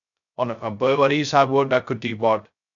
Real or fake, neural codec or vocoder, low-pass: fake; codec, 16 kHz, 0.2 kbps, FocalCodec; 7.2 kHz